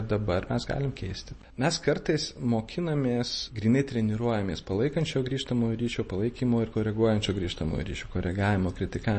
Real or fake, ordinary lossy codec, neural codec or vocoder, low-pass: real; MP3, 32 kbps; none; 10.8 kHz